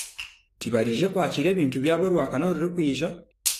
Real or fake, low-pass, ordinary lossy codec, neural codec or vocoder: fake; 14.4 kHz; AAC, 64 kbps; codec, 44.1 kHz, 2.6 kbps, SNAC